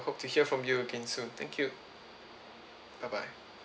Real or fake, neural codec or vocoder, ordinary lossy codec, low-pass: real; none; none; none